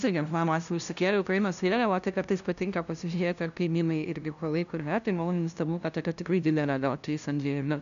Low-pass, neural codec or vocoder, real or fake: 7.2 kHz; codec, 16 kHz, 0.5 kbps, FunCodec, trained on LibriTTS, 25 frames a second; fake